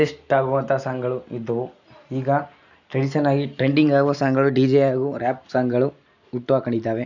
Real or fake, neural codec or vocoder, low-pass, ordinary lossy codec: real; none; 7.2 kHz; none